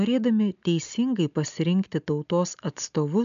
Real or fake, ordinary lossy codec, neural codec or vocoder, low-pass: real; AAC, 96 kbps; none; 7.2 kHz